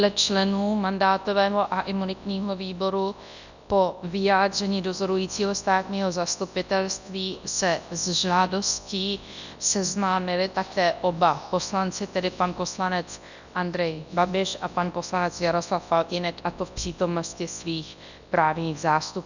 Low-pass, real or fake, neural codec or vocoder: 7.2 kHz; fake; codec, 24 kHz, 0.9 kbps, WavTokenizer, large speech release